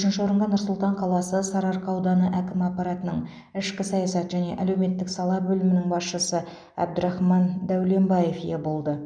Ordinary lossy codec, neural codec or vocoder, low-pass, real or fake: none; none; 9.9 kHz; real